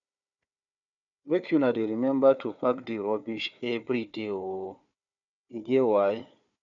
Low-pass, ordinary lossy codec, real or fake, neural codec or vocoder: 7.2 kHz; none; fake; codec, 16 kHz, 4 kbps, FunCodec, trained on Chinese and English, 50 frames a second